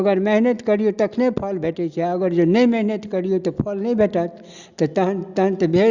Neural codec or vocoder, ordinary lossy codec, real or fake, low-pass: none; Opus, 64 kbps; real; 7.2 kHz